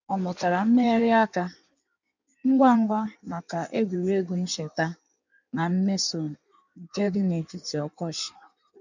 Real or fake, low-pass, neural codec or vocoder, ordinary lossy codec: fake; 7.2 kHz; codec, 16 kHz in and 24 kHz out, 1.1 kbps, FireRedTTS-2 codec; none